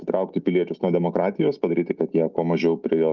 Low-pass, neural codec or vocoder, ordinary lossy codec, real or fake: 7.2 kHz; none; Opus, 24 kbps; real